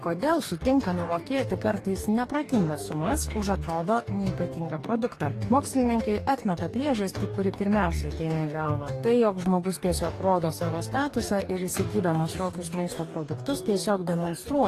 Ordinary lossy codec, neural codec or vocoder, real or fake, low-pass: AAC, 48 kbps; codec, 44.1 kHz, 2.6 kbps, DAC; fake; 14.4 kHz